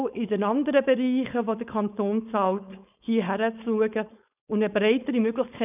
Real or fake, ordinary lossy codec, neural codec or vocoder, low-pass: fake; none; codec, 16 kHz, 4.8 kbps, FACodec; 3.6 kHz